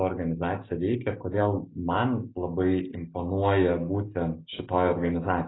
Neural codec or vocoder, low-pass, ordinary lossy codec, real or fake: none; 7.2 kHz; AAC, 16 kbps; real